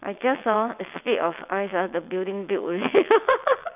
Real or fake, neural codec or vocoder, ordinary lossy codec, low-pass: fake; vocoder, 22.05 kHz, 80 mel bands, WaveNeXt; none; 3.6 kHz